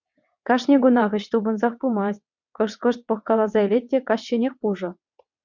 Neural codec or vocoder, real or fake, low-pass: vocoder, 22.05 kHz, 80 mel bands, WaveNeXt; fake; 7.2 kHz